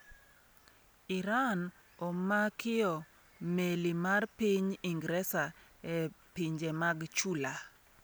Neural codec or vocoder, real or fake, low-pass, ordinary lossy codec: none; real; none; none